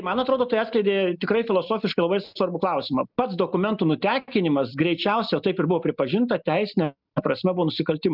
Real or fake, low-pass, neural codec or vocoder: real; 5.4 kHz; none